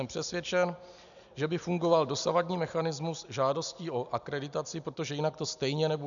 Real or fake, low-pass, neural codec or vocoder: real; 7.2 kHz; none